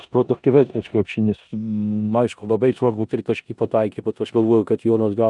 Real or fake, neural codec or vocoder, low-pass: fake; codec, 16 kHz in and 24 kHz out, 0.9 kbps, LongCat-Audio-Codec, four codebook decoder; 10.8 kHz